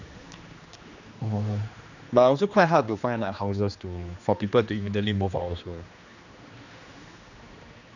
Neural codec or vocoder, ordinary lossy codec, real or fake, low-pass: codec, 16 kHz, 2 kbps, X-Codec, HuBERT features, trained on general audio; none; fake; 7.2 kHz